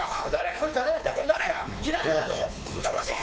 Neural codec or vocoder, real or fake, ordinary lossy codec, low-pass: codec, 16 kHz, 2 kbps, X-Codec, WavLM features, trained on Multilingual LibriSpeech; fake; none; none